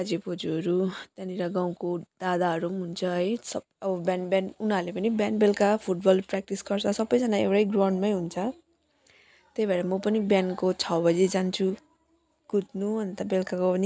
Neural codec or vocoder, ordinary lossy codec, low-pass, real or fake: none; none; none; real